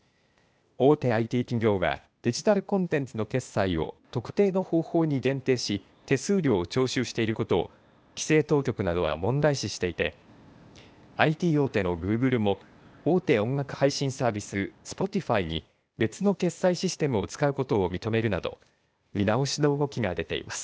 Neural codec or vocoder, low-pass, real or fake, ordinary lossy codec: codec, 16 kHz, 0.8 kbps, ZipCodec; none; fake; none